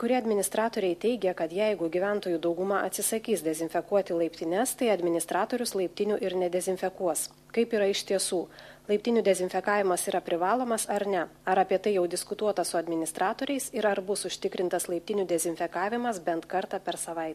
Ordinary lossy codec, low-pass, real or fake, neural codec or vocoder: MP3, 64 kbps; 14.4 kHz; real; none